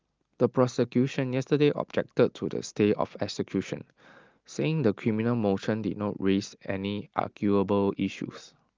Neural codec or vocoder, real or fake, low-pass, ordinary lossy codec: none; real; 7.2 kHz; Opus, 32 kbps